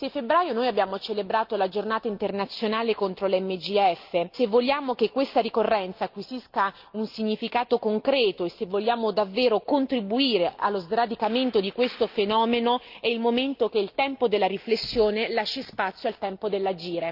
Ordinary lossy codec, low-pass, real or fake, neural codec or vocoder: Opus, 24 kbps; 5.4 kHz; real; none